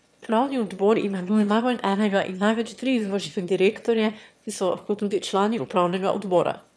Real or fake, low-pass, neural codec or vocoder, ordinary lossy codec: fake; none; autoencoder, 22.05 kHz, a latent of 192 numbers a frame, VITS, trained on one speaker; none